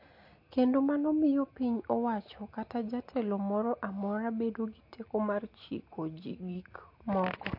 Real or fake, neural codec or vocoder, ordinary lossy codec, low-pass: real; none; MP3, 32 kbps; 5.4 kHz